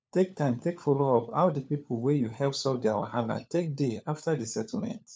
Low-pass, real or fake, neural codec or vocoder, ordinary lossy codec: none; fake; codec, 16 kHz, 4 kbps, FunCodec, trained on LibriTTS, 50 frames a second; none